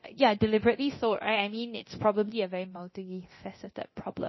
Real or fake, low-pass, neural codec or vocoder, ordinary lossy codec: fake; 7.2 kHz; codec, 24 kHz, 0.9 kbps, DualCodec; MP3, 24 kbps